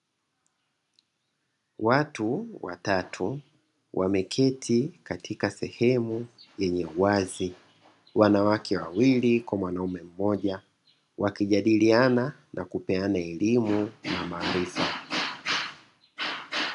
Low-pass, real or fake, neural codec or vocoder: 10.8 kHz; real; none